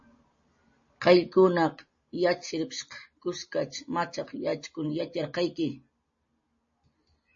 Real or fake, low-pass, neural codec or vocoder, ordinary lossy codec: real; 7.2 kHz; none; MP3, 32 kbps